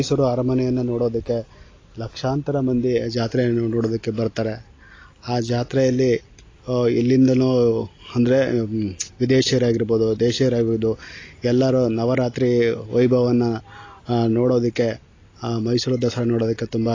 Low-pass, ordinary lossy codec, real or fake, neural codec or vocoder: 7.2 kHz; AAC, 32 kbps; real; none